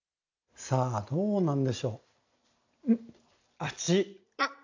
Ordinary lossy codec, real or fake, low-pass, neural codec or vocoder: AAC, 48 kbps; real; 7.2 kHz; none